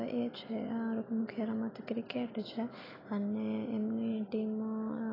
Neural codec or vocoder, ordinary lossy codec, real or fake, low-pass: none; AAC, 24 kbps; real; 5.4 kHz